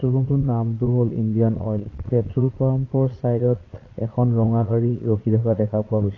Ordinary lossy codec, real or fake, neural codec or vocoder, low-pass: AAC, 32 kbps; fake; vocoder, 22.05 kHz, 80 mel bands, Vocos; 7.2 kHz